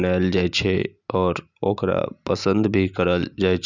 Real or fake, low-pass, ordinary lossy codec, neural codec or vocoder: real; 7.2 kHz; none; none